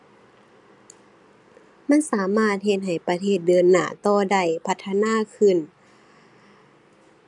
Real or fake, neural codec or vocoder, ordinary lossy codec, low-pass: real; none; none; 10.8 kHz